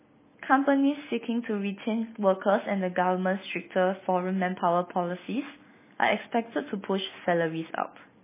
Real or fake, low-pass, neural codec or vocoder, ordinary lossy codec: real; 3.6 kHz; none; MP3, 16 kbps